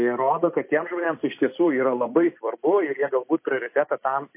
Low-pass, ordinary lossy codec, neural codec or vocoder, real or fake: 3.6 kHz; MP3, 24 kbps; vocoder, 44.1 kHz, 128 mel bands every 256 samples, BigVGAN v2; fake